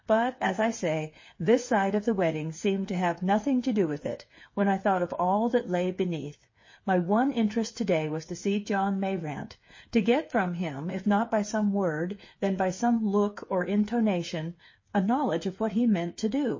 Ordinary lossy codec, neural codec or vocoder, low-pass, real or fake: MP3, 32 kbps; codec, 16 kHz, 8 kbps, FreqCodec, smaller model; 7.2 kHz; fake